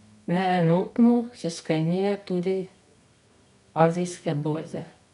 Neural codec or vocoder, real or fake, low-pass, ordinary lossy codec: codec, 24 kHz, 0.9 kbps, WavTokenizer, medium music audio release; fake; 10.8 kHz; none